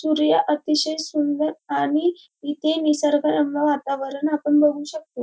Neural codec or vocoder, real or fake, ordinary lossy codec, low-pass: none; real; none; none